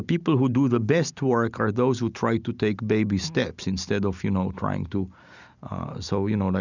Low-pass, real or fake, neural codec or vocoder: 7.2 kHz; real; none